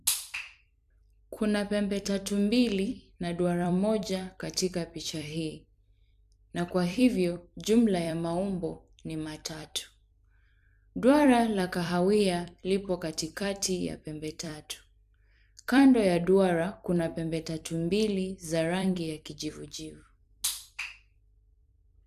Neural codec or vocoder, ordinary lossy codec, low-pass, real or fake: vocoder, 44.1 kHz, 128 mel bands every 256 samples, BigVGAN v2; none; 14.4 kHz; fake